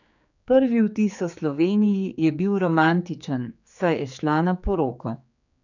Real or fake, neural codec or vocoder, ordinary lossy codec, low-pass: fake; codec, 16 kHz, 4 kbps, X-Codec, HuBERT features, trained on general audio; none; 7.2 kHz